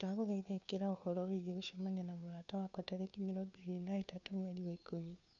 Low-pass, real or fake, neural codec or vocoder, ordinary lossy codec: 7.2 kHz; fake; codec, 16 kHz, 2 kbps, FunCodec, trained on Chinese and English, 25 frames a second; none